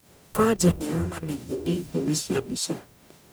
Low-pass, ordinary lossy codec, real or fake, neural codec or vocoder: none; none; fake; codec, 44.1 kHz, 0.9 kbps, DAC